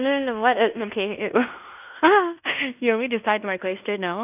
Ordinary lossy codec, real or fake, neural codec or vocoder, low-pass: none; fake; codec, 16 kHz in and 24 kHz out, 0.9 kbps, LongCat-Audio-Codec, fine tuned four codebook decoder; 3.6 kHz